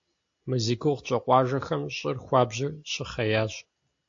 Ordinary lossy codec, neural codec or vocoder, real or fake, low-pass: AAC, 48 kbps; none; real; 7.2 kHz